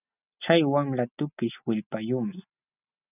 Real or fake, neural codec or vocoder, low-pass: real; none; 3.6 kHz